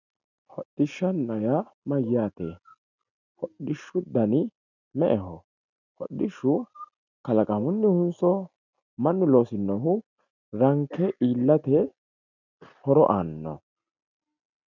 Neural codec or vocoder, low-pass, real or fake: none; 7.2 kHz; real